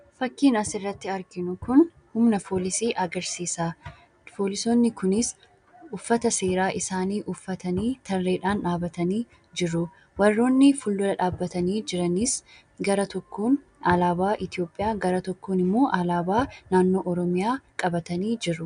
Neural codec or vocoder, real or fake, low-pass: none; real; 9.9 kHz